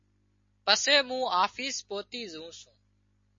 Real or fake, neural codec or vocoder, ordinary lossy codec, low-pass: real; none; MP3, 32 kbps; 7.2 kHz